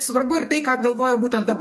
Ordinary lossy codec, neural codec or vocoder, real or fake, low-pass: MP3, 64 kbps; codec, 44.1 kHz, 2.6 kbps, SNAC; fake; 14.4 kHz